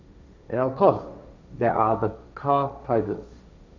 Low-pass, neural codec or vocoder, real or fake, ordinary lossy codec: 7.2 kHz; codec, 16 kHz, 1.1 kbps, Voila-Tokenizer; fake; none